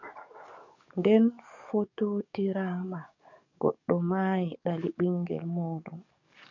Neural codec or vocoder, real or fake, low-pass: codec, 44.1 kHz, 7.8 kbps, DAC; fake; 7.2 kHz